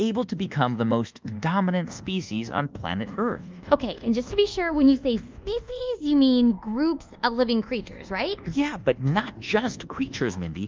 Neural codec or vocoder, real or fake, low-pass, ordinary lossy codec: codec, 24 kHz, 1.2 kbps, DualCodec; fake; 7.2 kHz; Opus, 24 kbps